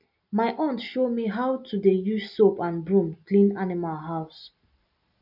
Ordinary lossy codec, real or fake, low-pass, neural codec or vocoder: none; real; 5.4 kHz; none